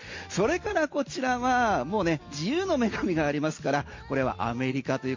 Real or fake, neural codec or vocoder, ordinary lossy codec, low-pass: real; none; MP3, 64 kbps; 7.2 kHz